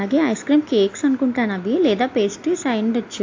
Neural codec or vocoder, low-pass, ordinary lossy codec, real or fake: none; 7.2 kHz; AAC, 48 kbps; real